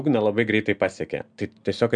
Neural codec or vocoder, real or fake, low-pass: none; real; 9.9 kHz